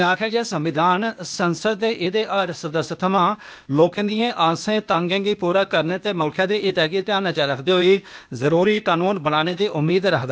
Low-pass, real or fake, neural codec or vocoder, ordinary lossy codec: none; fake; codec, 16 kHz, 0.8 kbps, ZipCodec; none